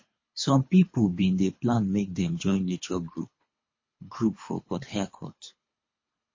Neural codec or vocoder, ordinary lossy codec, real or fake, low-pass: codec, 24 kHz, 3 kbps, HILCodec; MP3, 32 kbps; fake; 7.2 kHz